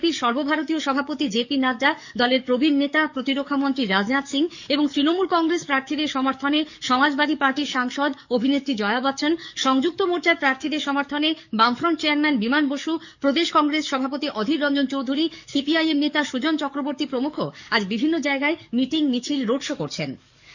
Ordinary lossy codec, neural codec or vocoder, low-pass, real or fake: none; codec, 44.1 kHz, 7.8 kbps, DAC; 7.2 kHz; fake